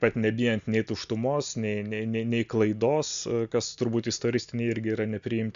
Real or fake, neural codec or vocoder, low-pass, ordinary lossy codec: real; none; 7.2 kHz; AAC, 96 kbps